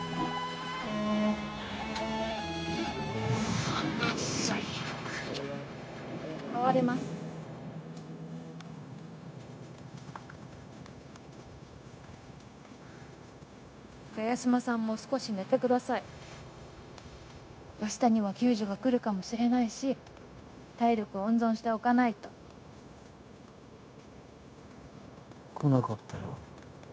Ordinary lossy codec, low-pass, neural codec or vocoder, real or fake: none; none; codec, 16 kHz, 0.9 kbps, LongCat-Audio-Codec; fake